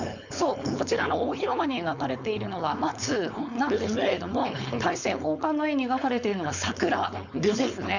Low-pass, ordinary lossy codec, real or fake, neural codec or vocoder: 7.2 kHz; none; fake; codec, 16 kHz, 4.8 kbps, FACodec